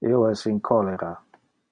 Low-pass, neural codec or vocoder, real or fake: 9.9 kHz; none; real